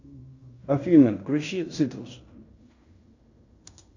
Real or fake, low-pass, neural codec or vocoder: fake; 7.2 kHz; codec, 16 kHz in and 24 kHz out, 0.9 kbps, LongCat-Audio-Codec, fine tuned four codebook decoder